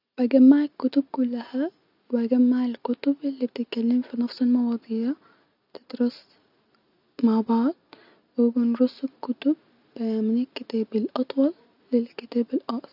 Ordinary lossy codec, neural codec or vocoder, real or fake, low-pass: none; none; real; 5.4 kHz